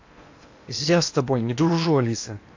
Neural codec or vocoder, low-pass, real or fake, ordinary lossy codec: codec, 16 kHz in and 24 kHz out, 0.8 kbps, FocalCodec, streaming, 65536 codes; 7.2 kHz; fake; AAC, 48 kbps